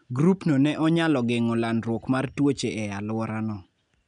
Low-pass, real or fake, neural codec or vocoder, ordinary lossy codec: 9.9 kHz; real; none; none